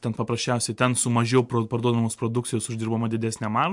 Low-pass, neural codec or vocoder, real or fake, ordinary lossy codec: 10.8 kHz; none; real; MP3, 64 kbps